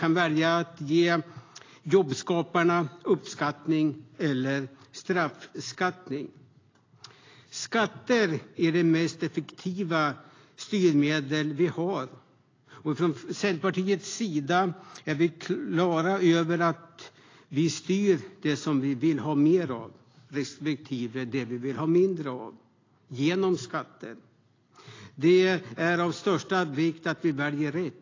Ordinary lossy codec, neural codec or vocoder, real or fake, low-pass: AAC, 32 kbps; none; real; 7.2 kHz